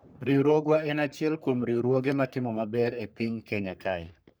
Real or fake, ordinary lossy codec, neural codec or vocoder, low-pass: fake; none; codec, 44.1 kHz, 3.4 kbps, Pupu-Codec; none